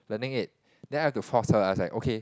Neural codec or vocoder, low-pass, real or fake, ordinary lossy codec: none; none; real; none